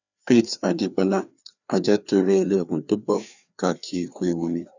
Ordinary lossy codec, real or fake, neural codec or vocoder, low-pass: none; fake; codec, 16 kHz, 2 kbps, FreqCodec, larger model; 7.2 kHz